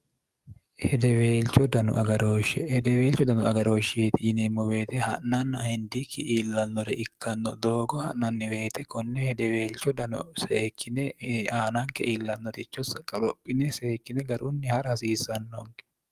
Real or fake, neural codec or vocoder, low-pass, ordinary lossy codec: fake; codec, 44.1 kHz, 7.8 kbps, DAC; 14.4 kHz; Opus, 32 kbps